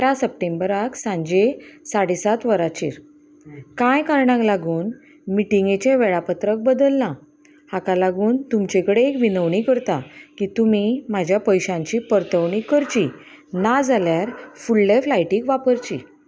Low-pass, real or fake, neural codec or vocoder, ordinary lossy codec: none; real; none; none